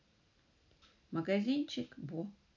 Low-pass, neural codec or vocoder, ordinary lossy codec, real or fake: 7.2 kHz; none; none; real